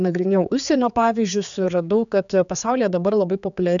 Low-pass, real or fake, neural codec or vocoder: 7.2 kHz; fake; codec, 16 kHz, 4 kbps, X-Codec, HuBERT features, trained on general audio